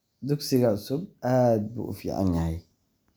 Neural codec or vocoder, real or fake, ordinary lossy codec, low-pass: none; real; none; none